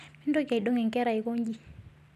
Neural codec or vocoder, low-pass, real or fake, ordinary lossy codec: none; 14.4 kHz; real; none